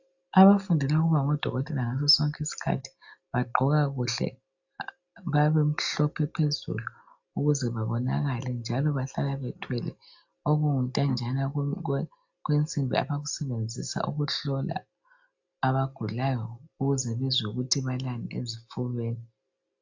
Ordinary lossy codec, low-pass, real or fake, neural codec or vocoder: AAC, 48 kbps; 7.2 kHz; real; none